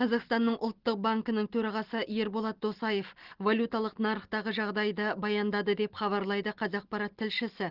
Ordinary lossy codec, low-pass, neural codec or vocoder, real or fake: Opus, 16 kbps; 5.4 kHz; none; real